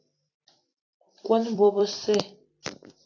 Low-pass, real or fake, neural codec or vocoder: 7.2 kHz; fake; vocoder, 44.1 kHz, 128 mel bands every 256 samples, BigVGAN v2